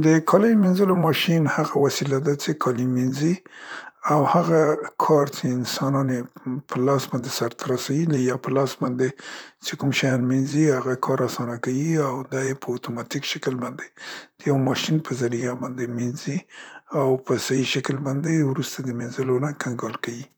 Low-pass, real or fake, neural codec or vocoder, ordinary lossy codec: none; fake; vocoder, 44.1 kHz, 128 mel bands, Pupu-Vocoder; none